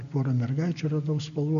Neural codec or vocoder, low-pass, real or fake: none; 7.2 kHz; real